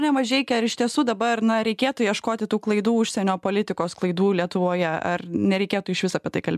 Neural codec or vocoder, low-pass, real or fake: none; 14.4 kHz; real